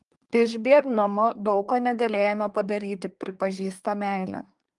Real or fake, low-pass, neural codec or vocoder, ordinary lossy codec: fake; 10.8 kHz; codec, 24 kHz, 1 kbps, SNAC; Opus, 32 kbps